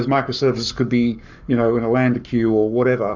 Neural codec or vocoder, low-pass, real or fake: codec, 44.1 kHz, 7.8 kbps, Pupu-Codec; 7.2 kHz; fake